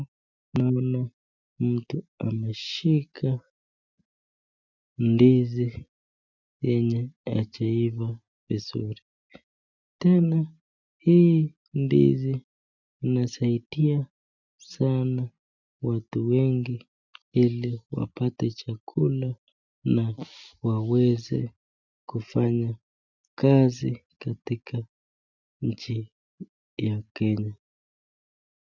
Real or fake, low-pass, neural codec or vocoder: real; 7.2 kHz; none